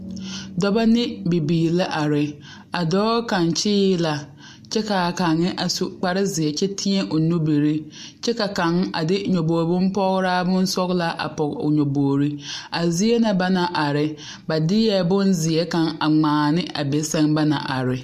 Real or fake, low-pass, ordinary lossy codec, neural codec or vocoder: real; 14.4 kHz; MP3, 64 kbps; none